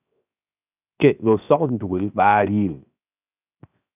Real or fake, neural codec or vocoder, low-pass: fake; codec, 16 kHz, 0.7 kbps, FocalCodec; 3.6 kHz